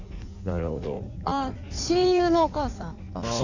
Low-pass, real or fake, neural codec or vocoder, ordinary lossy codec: 7.2 kHz; fake; codec, 16 kHz in and 24 kHz out, 1.1 kbps, FireRedTTS-2 codec; none